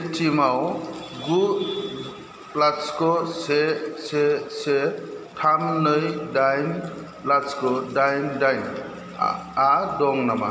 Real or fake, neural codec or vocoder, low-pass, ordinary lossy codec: real; none; none; none